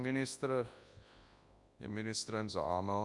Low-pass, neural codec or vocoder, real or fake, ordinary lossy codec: 10.8 kHz; codec, 24 kHz, 0.9 kbps, WavTokenizer, large speech release; fake; Opus, 64 kbps